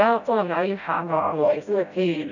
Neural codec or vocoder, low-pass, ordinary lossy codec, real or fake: codec, 16 kHz, 0.5 kbps, FreqCodec, smaller model; 7.2 kHz; none; fake